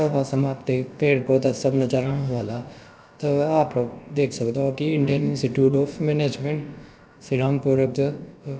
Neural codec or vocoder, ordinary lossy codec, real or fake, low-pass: codec, 16 kHz, about 1 kbps, DyCAST, with the encoder's durations; none; fake; none